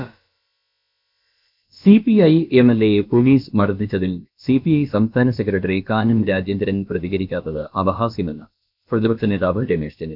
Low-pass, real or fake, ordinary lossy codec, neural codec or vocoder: 5.4 kHz; fake; none; codec, 16 kHz, about 1 kbps, DyCAST, with the encoder's durations